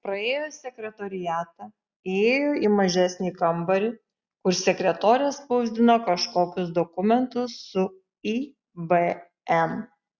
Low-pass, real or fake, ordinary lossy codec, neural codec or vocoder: 7.2 kHz; real; Opus, 64 kbps; none